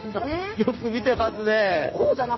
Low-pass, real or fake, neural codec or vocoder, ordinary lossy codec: 7.2 kHz; fake; codec, 32 kHz, 1.9 kbps, SNAC; MP3, 24 kbps